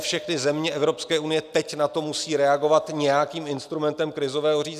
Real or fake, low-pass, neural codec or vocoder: real; 14.4 kHz; none